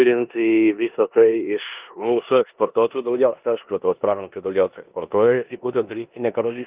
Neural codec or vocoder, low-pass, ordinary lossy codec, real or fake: codec, 16 kHz in and 24 kHz out, 0.9 kbps, LongCat-Audio-Codec, four codebook decoder; 3.6 kHz; Opus, 32 kbps; fake